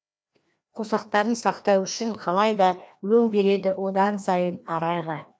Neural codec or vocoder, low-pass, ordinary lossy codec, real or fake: codec, 16 kHz, 1 kbps, FreqCodec, larger model; none; none; fake